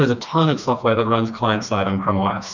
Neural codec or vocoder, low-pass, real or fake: codec, 16 kHz, 2 kbps, FreqCodec, smaller model; 7.2 kHz; fake